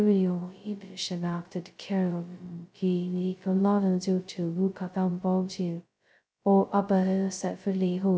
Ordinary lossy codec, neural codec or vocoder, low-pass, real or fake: none; codec, 16 kHz, 0.2 kbps, FocalCodec; none; fake